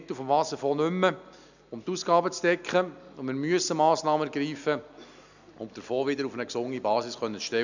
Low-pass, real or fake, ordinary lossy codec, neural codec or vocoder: 7.2 kHz; real; none; none